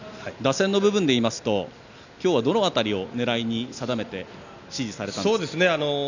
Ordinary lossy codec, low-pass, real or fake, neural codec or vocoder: none; 7.2 kHz; real; none